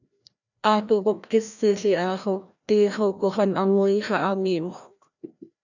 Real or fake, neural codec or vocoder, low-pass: fake; codec, 16 kHz, 1 kbps, FreqCodec, larger model; 7.2 kHz